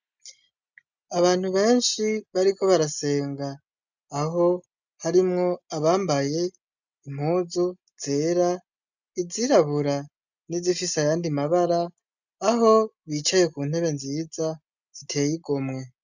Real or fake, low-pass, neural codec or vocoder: real; 7.2 kHz; none